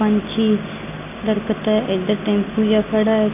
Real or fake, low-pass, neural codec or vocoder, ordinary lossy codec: real; 3.6 kHz; none; none